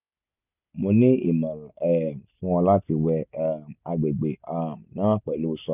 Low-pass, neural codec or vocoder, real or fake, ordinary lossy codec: 3.6 kHz; none; real; none